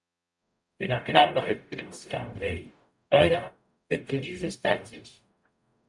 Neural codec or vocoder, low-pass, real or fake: codec, 44.1 kHz, 0.9 kbps, DAC; 10.8 kHz; fake